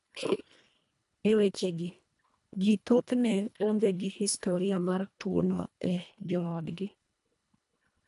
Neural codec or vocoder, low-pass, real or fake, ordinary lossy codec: codec, 24 kHz, 1.5 kbps, HILCodec; 10.8 kHz; fake; none